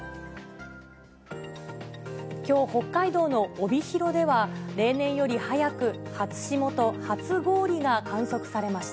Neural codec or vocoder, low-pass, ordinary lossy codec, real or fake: none; none; none; real